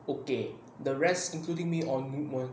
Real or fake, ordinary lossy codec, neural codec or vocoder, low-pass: real; none; none; none